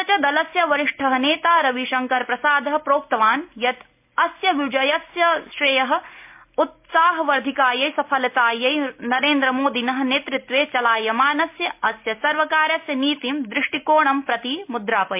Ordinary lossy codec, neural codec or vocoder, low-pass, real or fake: MP3, 32 kbps; none; 3.6 kHz; real